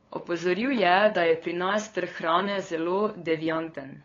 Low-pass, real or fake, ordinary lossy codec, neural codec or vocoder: 7.2 kHz; fake; AAC, 32 kbps; codec, 16 kHz, 8 kbps, FunCodec, trained on LibriTTS, 25 frames a second